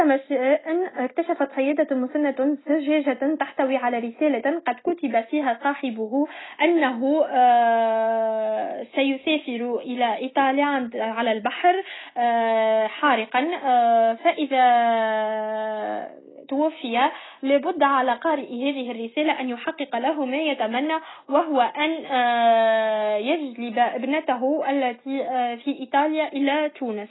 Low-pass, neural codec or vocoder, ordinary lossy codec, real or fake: 7.2 kHz; none; AAC, 16 kbps; real